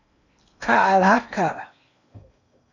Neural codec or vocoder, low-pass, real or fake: codec, 16 kHz in and 24 kHz out, 0.8 kbps, FocalCodec, streaming, 65536 codes; 7.2 kHz; fake